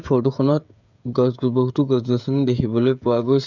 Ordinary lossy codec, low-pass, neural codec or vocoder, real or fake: none; 7.2 kHz; codec, 16 kHz, 16 kbps, FreqCodec, smaller model; fake